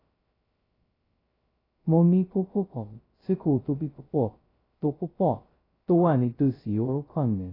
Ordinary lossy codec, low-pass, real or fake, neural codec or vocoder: AAC, 24 kbps; 5.4 kHz; fake; codec, 16 kHz, 0.2 kbps, FocalCodec